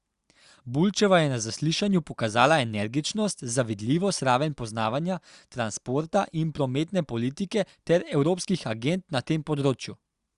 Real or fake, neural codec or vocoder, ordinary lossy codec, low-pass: real; none; Opus, 64 kbps; 10.8 kHz